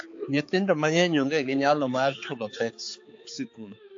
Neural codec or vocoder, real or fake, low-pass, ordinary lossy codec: codec, 16 kHz, 4 kbps, X-Codec, HuBERT features, trained on balanced general audio; fake; 7.2 kHz; AAC, 48 kbps